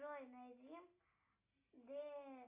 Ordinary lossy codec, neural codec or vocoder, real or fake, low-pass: AAC, 24 kbps; none; real; 3.6 kHz